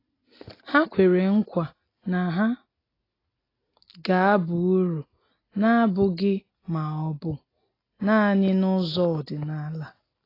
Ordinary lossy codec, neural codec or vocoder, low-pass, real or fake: AAC, 24 kbps; none; 5.4 kHz; real